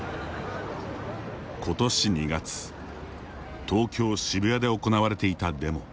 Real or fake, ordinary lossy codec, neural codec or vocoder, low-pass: real; none; none; none